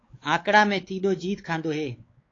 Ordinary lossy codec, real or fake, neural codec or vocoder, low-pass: AAC, 32 kbps; fake; codec, 16 kHz, 4 kbps, X-Codec, WavLM features, trained on Multilingual LibriSpeech; 7.2 kHz